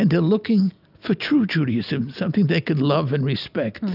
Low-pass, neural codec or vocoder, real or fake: 5.4 kHz; none; real